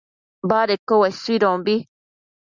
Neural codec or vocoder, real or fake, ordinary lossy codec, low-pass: none; real; Opus, 64 kbps; 7.2 kHz